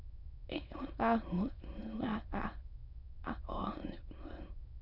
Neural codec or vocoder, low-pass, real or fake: autoencoder, 22.05 kHz, a latent of 192 numbers a frame, VITS, trained on many speakers; 5.4 kHz; fake